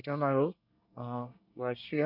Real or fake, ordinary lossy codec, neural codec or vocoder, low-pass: fake; none; codec, 24 kHz, 1 kbps, SNAC; 5.4 kHz